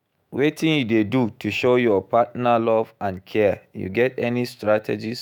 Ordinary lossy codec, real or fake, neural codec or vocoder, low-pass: none; fake; autoencoder, 48 kHz, 128 numbers a frame, DAC-VAE, trained on Japanese speech; 19.8 kHz